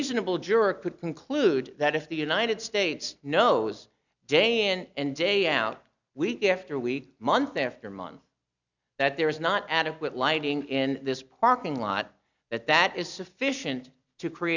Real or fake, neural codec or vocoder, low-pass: real; none; 7.2 kHz